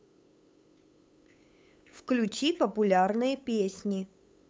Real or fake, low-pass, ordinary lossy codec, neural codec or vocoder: fake; none; none; codec, 16 kHz, 8 kbps, FunCodec, trained on LibriTTS, 25 frames a second